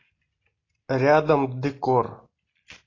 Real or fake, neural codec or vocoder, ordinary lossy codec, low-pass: real; none; AAC, 32 kbps; 7.2 kHz